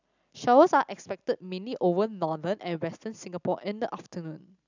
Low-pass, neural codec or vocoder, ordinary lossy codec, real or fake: 7.2 kHz; none; none; real